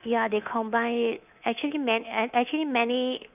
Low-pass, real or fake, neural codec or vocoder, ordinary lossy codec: 3.6 kHz; fake; codec, 24 kHz, 3.1 kbps, DualCodec; none